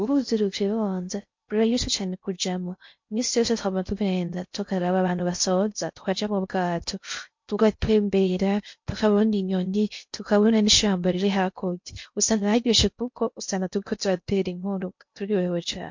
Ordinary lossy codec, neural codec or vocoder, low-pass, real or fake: MP3, 48 kbps; codec, 16 kHz in and 24 kHz out, 0.6 kbps, FocalCodec, streaming, 2048 codes; 7.2 kHz; fake